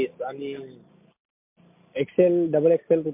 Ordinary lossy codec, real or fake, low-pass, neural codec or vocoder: MP3, 32 kbps; real; 3.6 kHz; none